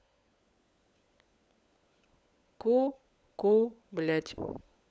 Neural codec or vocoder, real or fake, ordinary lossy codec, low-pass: codec, 16 kHz, 16 kbps, FunCodec, trained on LibriTTS, 50 frames a second; fake; none; none